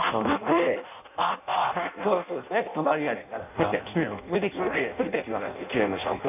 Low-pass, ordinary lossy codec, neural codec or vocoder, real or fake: 3.6 kHz; none; codec, 16 kHz in and 24 kHz out, 0.6 kbps, FireRedTTS-2 codec; fake